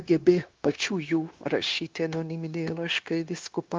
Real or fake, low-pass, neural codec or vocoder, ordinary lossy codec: fake; 7.2 kHz; codec, 16 kHz, 0.9 kbps, LongCat-Audio-Codec; Opus, 32 kbps